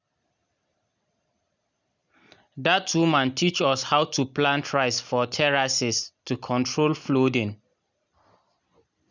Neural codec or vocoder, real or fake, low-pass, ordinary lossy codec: none; real; 7.2 kHz; none